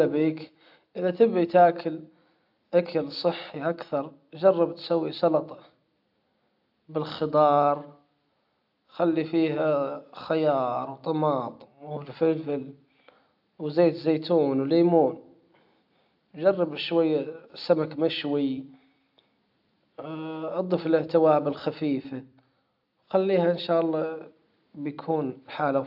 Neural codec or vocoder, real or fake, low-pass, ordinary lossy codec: none; real; 5.4 kHz; AAC, 48 kbps